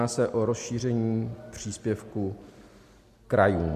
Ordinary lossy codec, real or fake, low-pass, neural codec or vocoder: MP3, 64 kbps; real; 14.4 kHz; none